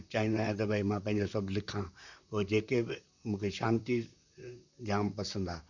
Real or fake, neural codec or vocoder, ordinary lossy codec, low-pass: fake; vocoder, 44.1 kHz, 128 mel bands, Pupu-Vocoder; none; 7.2 kHz